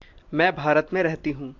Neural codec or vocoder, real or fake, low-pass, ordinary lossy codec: none; real; 7.2 kHz; AAC, 48 kbps